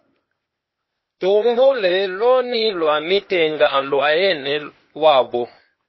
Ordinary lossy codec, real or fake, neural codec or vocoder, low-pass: MP3, 24 kbps; fake; codec, 16 kHz, 0.8 kbps, ZipCodec; 7.2 kHz